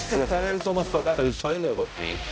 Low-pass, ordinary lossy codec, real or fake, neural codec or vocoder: none; none; fake; codec, 16 kHz, 0.5 kbps, X-Codec, HuBERT features, trained on balanced general audio